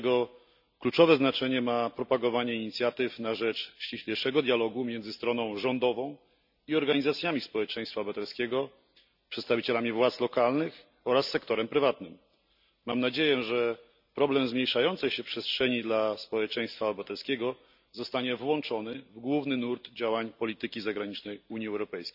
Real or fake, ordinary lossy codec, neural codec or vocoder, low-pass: real; none; none; 5.4 kHz